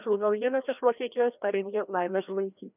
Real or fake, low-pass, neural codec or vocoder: fake; 3.6 kHz; codec, 16 kHz, 1 kbps, FreqCodec, larger model